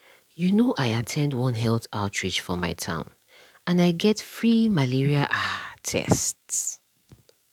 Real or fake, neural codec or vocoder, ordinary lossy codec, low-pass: fake; codec, 44.1 kHz, 7.8 kbps, DAC; none; 19.8 kHz